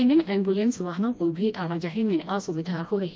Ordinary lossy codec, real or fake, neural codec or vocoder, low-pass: none; fake; codec, 16 kHz, 1 kbps, FreqCodec, smaller model; none